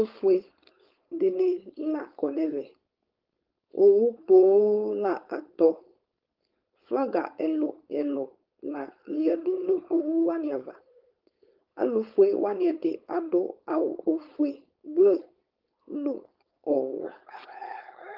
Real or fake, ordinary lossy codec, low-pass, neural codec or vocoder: fake; Opus, 32 kbps; 5.4 kHz; codec, 16 kHz, 4.8 kbps, FACodec